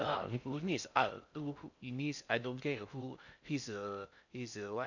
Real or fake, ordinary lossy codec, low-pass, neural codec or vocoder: fake; none; 7.2 kHz; codec, 16 kHz in and 24 kHz out, 0.6 kbps, FocalCodec, streaming, 2048 codes